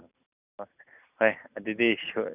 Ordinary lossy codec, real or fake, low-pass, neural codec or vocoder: none; real; 3.6 kHz; none